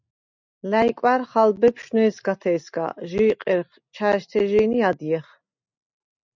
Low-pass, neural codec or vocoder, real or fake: 7.2 kHz; none; real